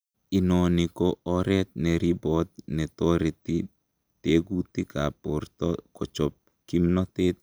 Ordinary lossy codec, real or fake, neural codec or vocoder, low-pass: none; real; none; none